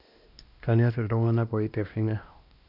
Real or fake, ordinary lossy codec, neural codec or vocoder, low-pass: fake; none; codec, 16 kHz, 1 kbps, X-Codec, WavLM features, trained on Multilingual LibriSpeech; 5.4 kHz